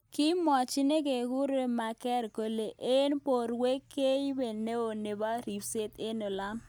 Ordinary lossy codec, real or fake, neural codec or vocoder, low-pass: none; real; none; none